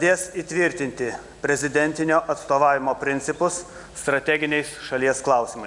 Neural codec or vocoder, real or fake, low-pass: none; real; 10.8 kHz